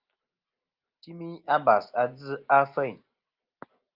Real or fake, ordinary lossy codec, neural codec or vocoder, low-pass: real; Opus, 24 kbps; none; 5.4 kHz